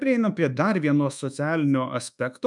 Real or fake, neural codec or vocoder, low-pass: fake; codec, 24 kHz, 1.2 kbps, DualCodec; 10.8 kHz